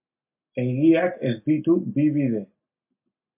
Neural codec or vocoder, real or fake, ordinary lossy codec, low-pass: none; real; MP3, 32 kbps; 3.6 kHz